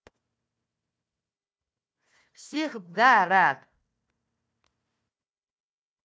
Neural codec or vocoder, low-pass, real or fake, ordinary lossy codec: codec, 16 kHz, 1 kbps, FunCodec, trained on Chinese and English, 50 frames a second; none; fake; none